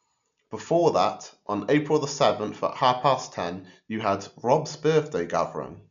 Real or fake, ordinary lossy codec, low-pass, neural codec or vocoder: real; none; 7.2 kHz; none